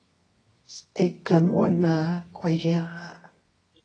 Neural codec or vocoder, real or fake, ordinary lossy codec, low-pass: codec, 24 kHz, 0.9 kbps, WavTokenizer, medium music audio release; fake; AAC, 32 kbps; 9.9 kHz